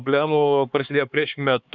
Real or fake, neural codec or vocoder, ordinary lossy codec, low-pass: fake; codec, 16 kHz, 4 kbps, X-Codec, HuBERT features, trained on LibriSpeech; Opus, 64 kbps; 7.2 kHz